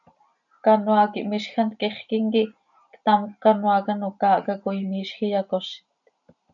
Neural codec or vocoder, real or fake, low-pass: none; real; 7.2 kHz